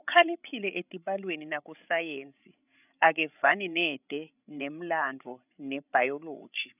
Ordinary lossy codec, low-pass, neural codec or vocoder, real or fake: none; 3.6 kHz; codec, 16 kHz, 16 kbps, FreqCodec, larger model; fake